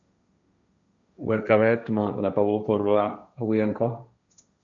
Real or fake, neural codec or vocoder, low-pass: fake; codec, 16 kHz, 1.1 kbps, Voila-Tokenizer; 7.2 kHz